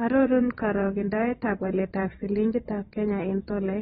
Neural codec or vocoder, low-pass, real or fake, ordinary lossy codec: vocoder, 22.05 kHz, 80 mel bands, WaveNeXt; 9.9 kHz; fake; AAC, 16 kbps